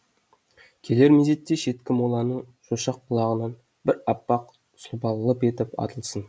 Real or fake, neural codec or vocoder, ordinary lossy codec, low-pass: real; none; none; none